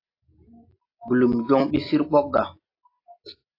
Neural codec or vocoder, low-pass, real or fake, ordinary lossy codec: none; 5.4 kHz; real; AAC, 32 kbps